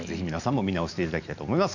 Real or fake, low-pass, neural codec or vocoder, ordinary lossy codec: real; 7.2 kHz; none; none